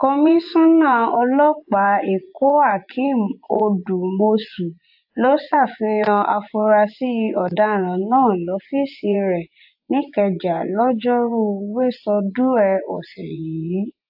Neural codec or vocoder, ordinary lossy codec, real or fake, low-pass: vocoder, 24 kHz, 100 mel bands, Vocos; AAC, 48 kbps; fake; 5.4 kHz